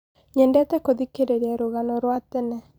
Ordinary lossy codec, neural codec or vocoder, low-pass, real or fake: none; vocoder, 44.1 kHz, 128 mel bands every 256 samples, BigVGAN v2; none; fake